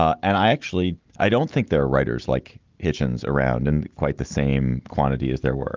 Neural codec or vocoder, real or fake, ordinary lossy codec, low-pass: none; real; Opus, 32 kbps; 7.2 kHz